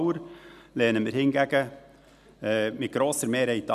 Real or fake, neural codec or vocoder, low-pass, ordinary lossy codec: real; none; 14.4 kHz; none